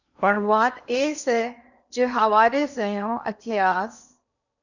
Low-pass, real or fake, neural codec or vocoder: 7.2 kHz; fake; codec, 16 kHz in and 24 kHz out, 0.8 kbps, FocalCodec, streaming, 65536 codes